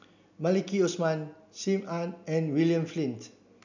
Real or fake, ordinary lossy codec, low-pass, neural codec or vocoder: real; none; 7.2 kHz; none